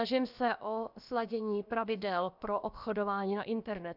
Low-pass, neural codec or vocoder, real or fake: 5.4 kHz; codec, 16 kHz, about 1 kbps, DyCAST, with the encoder's durations; fake